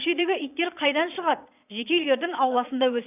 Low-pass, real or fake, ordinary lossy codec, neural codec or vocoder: 3.6 kHz; fake; none; vocoder, 22.05 kHz, 80 mel bands, Vocos